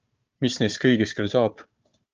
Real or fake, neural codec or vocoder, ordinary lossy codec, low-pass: fake; codec, 16 kHz, 4 kbps, FunCodec, trained on Chinese and English, 50 frames a second; Opus, 24 kbps; 7.2 kHz